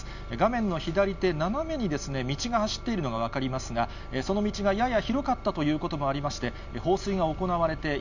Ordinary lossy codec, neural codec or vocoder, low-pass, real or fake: none; none; 7.2 kHz; real